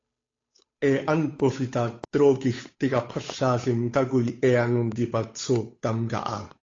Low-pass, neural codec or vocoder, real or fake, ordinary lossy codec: 7.2 kHz; codec, 16 kHz, 8 kbps, FunCodec, trained on Chinese and English, 25 frames a second; fake; AAC, 32 kbps